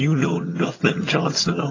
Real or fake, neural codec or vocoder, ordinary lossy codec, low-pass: fake; vocoder, 22.05 kHz, 80 mel bands, HiFi-GAN; AAC, 32 kbps; 7.2 kHz